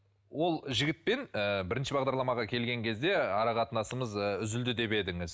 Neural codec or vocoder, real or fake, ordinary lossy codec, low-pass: none; real; none; none